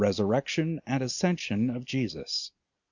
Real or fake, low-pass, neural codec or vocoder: real; 7.2 kHz; none